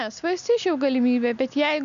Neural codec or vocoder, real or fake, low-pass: none; real; 7.2 kHz